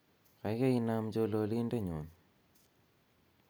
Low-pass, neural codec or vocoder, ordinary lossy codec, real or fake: none; none; none; real